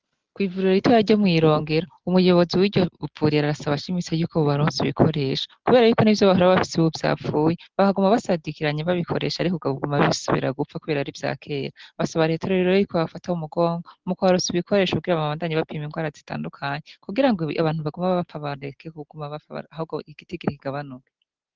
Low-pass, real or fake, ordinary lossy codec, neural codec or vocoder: 7.2 kHz; real; Opus, 16 kbps; none